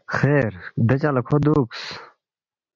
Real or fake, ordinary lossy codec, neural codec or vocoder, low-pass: real; MP3, 48 kbps; none; 7.2 kHz